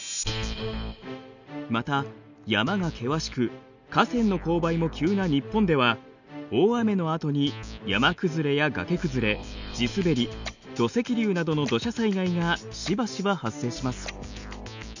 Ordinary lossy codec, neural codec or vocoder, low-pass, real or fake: none; none; 7.2 kHz; real